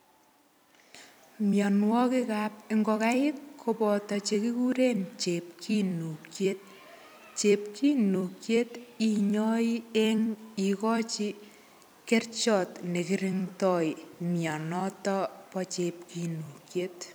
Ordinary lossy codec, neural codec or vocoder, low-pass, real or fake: none; vocoder, 44.1 kHz, 128 mel bands every 256 samples, BigVGAN v2; none; fake